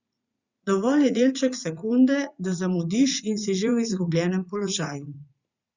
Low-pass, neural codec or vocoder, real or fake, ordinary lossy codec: 7.2 kHz; vocoder, 44.1 kHz, 80 mel bands, Vocos; fake; Opus, 64 kbps